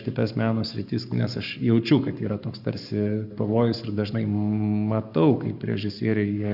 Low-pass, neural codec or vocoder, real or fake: 5.4 kHz; codec, 44.1 kHz, 7.8 kbps, Pupu-Codec; fake